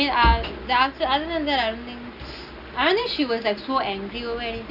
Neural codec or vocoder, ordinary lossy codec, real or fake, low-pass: none; none; real; 5.4 kHz